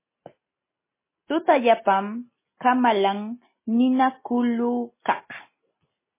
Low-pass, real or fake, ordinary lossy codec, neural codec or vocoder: 3.6 kHz; real; MP3, 16 kbps; none